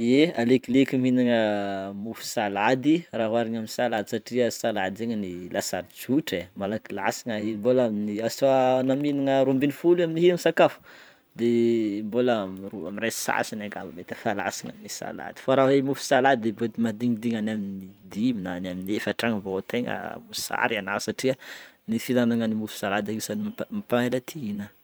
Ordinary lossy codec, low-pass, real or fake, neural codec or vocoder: none; none; fake; vocoder, 44.1 kHz, 128 mel bands every 512 samples, BigVGAN v2